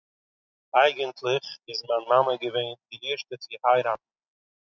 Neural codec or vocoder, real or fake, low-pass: none; real; 7.2 kHz